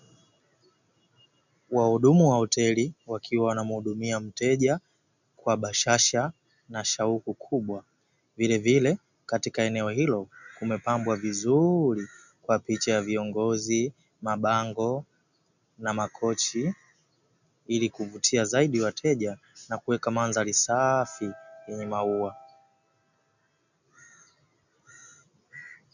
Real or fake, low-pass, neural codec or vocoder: real; 7.2 kHz; none